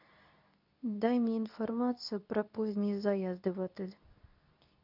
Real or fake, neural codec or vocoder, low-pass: fake; codec, 24 kHz, 0.9 kbps, WavTokenizer, medium speech release version 2; 5.4 kHz